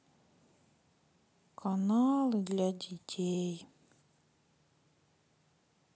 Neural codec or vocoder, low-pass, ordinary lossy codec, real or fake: none; none; none; real